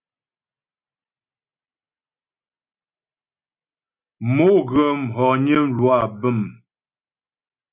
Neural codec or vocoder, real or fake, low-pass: none; real; 3.6 kHz